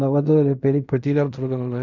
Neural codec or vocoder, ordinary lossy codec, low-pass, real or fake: codec, 16 kHz in and 24 kHz out, 0.4 kbps, LongCat-Audio-Codec, fine tuned four codebook decoder; none; 7.2 kHz; fake